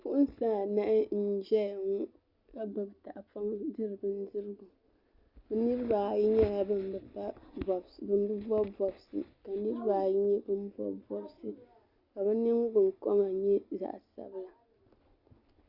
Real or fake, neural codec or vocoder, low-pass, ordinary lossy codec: real; none; 5.4 kHz; Opus, 64 kbps